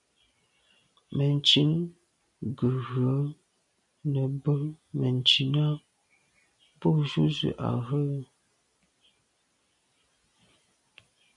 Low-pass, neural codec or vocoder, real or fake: 10.8 kHz; none; real